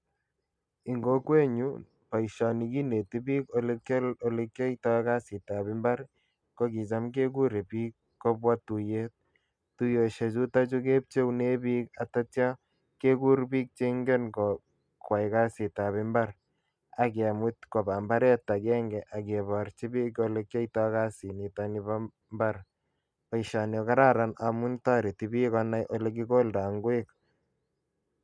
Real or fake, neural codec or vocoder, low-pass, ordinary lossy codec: real; none; 9.9 kHz; none